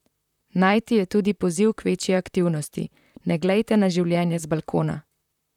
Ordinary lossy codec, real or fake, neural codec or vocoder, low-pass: none; fake; vocoder, 44.1 kHz, 128 mel bands, Pupu-Vocoder; 19.8 kHz